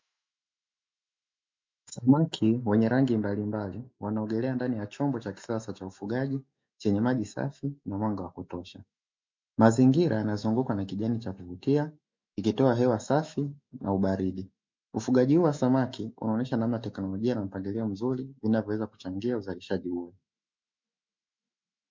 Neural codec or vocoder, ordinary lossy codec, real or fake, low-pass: autoencoder, 48 kHz, 128 numbers a frame, DAC-VAE, trained on Japanese speech; MP3, 64 kbps; fake; 7.2 kHz